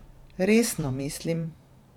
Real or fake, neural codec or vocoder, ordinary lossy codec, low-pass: fake; vocoder, 44.1 kHz, 128 mel bands every 512 samples, BigVGAN v2; none; 19.8 kHz